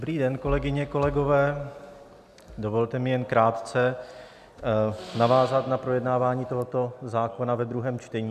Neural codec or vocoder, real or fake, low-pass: none; real; 14.4 kHz